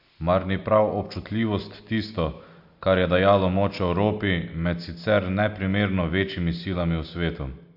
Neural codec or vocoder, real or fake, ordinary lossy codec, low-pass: none; real; AAC, 48 kbps; 5.4 kHz